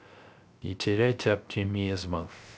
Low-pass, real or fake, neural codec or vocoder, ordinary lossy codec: none; fake; codec, 16 kHz, 0.3 kbps, FocalCodec; none